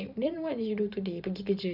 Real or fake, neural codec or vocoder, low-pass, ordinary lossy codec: fake; vocoder, 44.1 kHz, 128 mel bands every 512 samples, BigVGAN v2; 5.4 kHz; none